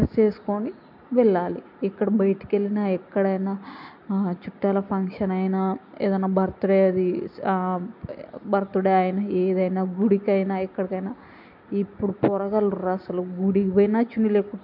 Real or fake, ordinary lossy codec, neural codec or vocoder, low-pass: real; MP3, 48 kbps; none; 5.4 kHz